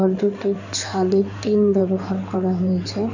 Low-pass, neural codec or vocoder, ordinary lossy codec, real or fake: 7.2 kHz; codec, 16 kHz in and 24 kHz out, 2.2 kbps, FireRedTTS-2 codec; none; fake